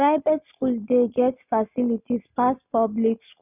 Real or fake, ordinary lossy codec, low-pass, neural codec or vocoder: real; none; 3.6 kHz; none